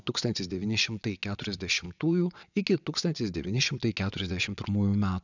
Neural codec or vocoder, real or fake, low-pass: vocoder, 22.05 kHz, 80 mel bands, WaveNeXt; fake; 7.2 kHz